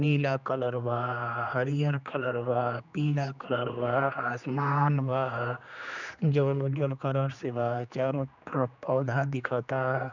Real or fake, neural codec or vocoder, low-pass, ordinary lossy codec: fake; codec, 16 kHz, 2 kbps, X-Codec, HuBERT features, trained on general audio; 7.2 kHz; none